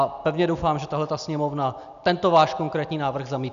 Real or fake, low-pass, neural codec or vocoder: real; 7.2 kHz; none